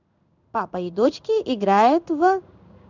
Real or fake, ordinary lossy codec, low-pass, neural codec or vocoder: fake; none; 7.2 kHz; codec, 16 kHz in and 24 kHz out, 1 kbps, XY-Tokenizer